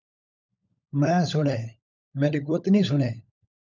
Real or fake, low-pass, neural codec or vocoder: fake; 7.2 kHz; codec, 16 kHz, 16 kbps, FunCodec, trained on LibriTTS, 50 frames a second